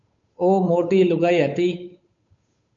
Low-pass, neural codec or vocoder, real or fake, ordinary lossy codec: 7.2 kHz; codec, 16 kHz, 8 kbps, FunCodec, trained on Chinese and English, 25 frames a second; fake; MP3, 48 kbps